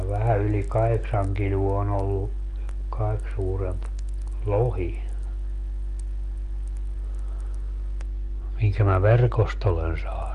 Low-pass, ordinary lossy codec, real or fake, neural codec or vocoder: 10.8 kHz; Opus, 32 kbps; real; none